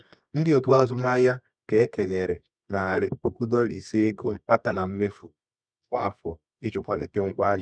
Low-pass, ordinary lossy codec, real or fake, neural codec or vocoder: 9.9 kHz; none; fake; codec, 24 kHz, 0.9 kbps, WavTokenizer, medium music audio release